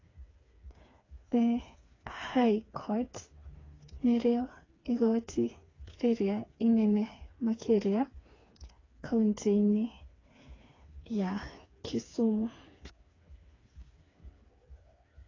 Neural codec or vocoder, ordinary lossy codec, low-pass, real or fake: codec, 16 kHz, 4 kbps, FreqCodec, smaller model; AAC, 32 kbps; 7.2 kHz; fake